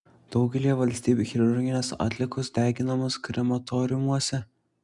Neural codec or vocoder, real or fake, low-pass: none; real; 10.8 kHz